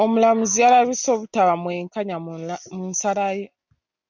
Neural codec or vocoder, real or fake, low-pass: none; real; 7.2 kHz